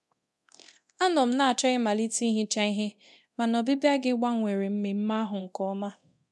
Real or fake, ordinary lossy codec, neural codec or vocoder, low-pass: fake; none; codec, 24 kHz, 0.9 kbps, DualCodec; none